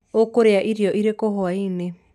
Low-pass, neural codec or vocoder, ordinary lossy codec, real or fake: 14.4 kHz; none; none; real